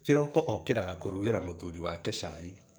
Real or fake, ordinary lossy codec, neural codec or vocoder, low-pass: fake; none; codec, 44.1 kHz, 2.6 kbps, SNAC; none